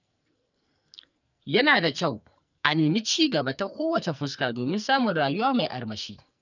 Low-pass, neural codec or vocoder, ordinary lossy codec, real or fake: 7.2 kHz; codec, 44.1 kHz, 2.6 kbps, SNAC; none; fake